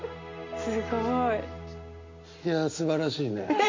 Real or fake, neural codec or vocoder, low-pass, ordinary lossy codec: real; none; 7.2 kHz; MP3, 48 kbps